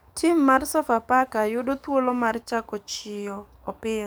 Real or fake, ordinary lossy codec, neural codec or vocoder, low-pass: fake; none; codec, 44.1 kHz, 7.8 kbps, DAC; none